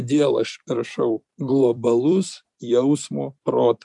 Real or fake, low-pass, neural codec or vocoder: fake; 10.8 kHz; vocoder, 44.1 kHz, 128 mel bands every 256 samples, BigVGAN v2